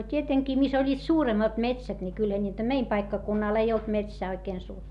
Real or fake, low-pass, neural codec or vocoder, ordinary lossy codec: real; none; none; none